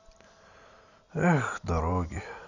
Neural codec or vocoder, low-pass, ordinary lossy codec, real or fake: none; 7.2 kHz; none; real